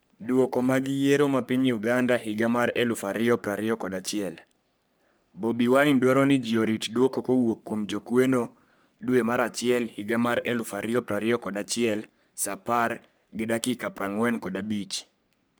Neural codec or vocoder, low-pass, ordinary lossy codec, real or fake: codec, 44.1 kHz, 3.4 kbps, Pupu-Codec; none; none; fake